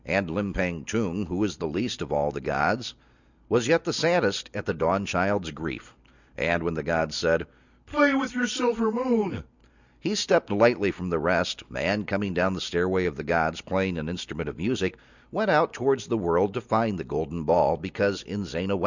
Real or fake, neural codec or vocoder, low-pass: real; none; 7.2 kHz